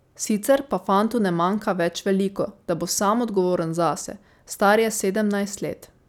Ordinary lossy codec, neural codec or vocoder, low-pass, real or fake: none; none; 19.8 kHz; real